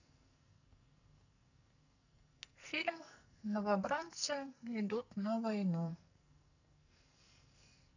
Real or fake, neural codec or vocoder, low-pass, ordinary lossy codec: fake; codec, 44.1 kHz, 2.6 kbps, SNAC; 7.2 kHz; none